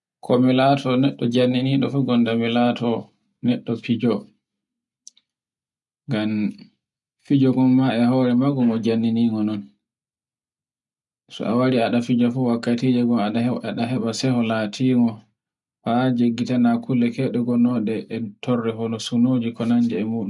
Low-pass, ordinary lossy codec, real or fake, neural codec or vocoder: 10.8 kHz; none; real; none